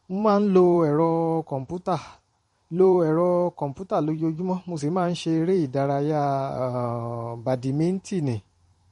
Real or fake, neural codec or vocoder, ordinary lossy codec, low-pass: fake; vocoder, 44.1 kHz, 128 mel bands every 512 samples, BigVGAN v2; MP3, 48 kbps; 19.8 kHz